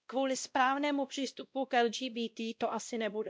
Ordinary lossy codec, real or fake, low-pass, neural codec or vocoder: none; fake; none; codec, 16 kHz, 1 kbps, X-Codec, WavLM features, trained on Multilingual LibriSpeech